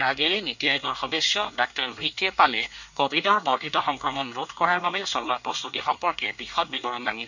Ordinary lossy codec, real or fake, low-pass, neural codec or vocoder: none; fake; 7.2 kHz; codec, 24 kHz, 1 kbps, SNAC